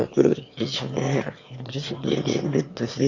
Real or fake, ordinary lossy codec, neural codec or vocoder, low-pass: fake; Opus, 64 kbps; autoencoder, 22.05 kHz, a latent of 192 numbers a frame, VITS, trained on one speaker; 7.2 kHz